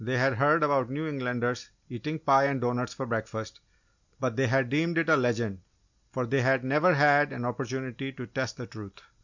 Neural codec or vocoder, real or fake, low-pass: none; real; 7.2 kHz